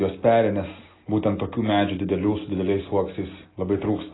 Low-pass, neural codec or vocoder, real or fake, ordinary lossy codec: 7.2 kHz; none; real; AAC, 16 kbps